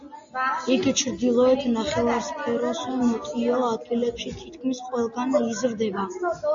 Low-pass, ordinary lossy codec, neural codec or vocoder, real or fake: 7.2 kHz; AAC, 64 kbps; none; real